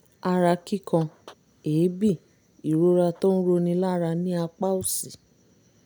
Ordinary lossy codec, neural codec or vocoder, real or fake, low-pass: none; none; real; none